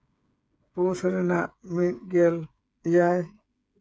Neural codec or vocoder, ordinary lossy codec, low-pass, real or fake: codec, 16 kHz, 8 kbps, FreqCodec, smaller model; none; none; fake